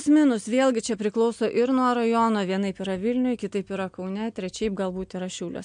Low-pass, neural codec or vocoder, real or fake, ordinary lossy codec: 9.9 kHz; none; real; MP3, 64 kbps